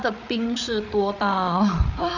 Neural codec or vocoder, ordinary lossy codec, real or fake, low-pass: codec, 16 kHz, 8 kbps, FreqCodec, larger model; none; fake; 7.2 kHz